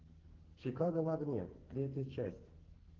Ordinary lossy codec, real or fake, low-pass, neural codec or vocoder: Opus, 16 kbps; fake; 7.2 kHz; codec, 44.1 kHz, 2.6 kbps, SNAC